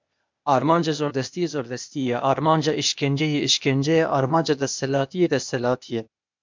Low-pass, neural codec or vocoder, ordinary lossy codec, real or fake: 7.2 kHz; codec, 16 kHz, 0.8 kbps, ZipCodec; MP3, 64 kbps; fake